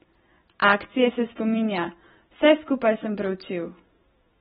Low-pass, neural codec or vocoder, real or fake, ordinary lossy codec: 19.8 kHz; none; real; AAC, 16 kbps